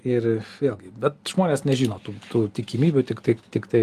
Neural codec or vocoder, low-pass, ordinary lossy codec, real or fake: none; 14.4 kHz; Opus, 32 kbps; real